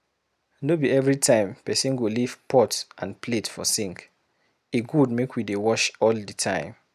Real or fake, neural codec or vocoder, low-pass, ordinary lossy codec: real; none; 14.4 kHz; none